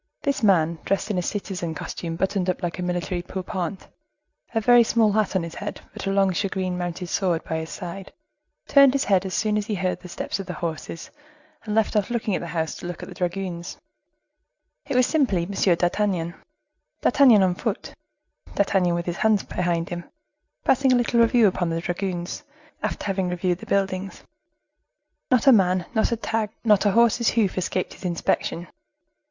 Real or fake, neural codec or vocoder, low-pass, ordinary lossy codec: real; none; 7.2 kHz; Opus, 64 kbps